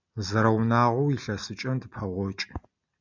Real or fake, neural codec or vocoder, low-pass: real; none; 7.2 kHz